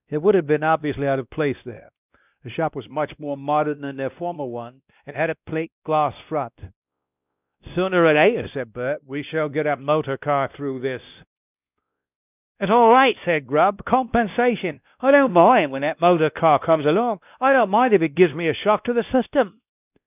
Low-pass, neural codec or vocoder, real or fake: 3.6 kHz; codec, 16 kHz, 1 kbps, X-Codec, WavLM features, trained on Multilingual LibriSpeech; fake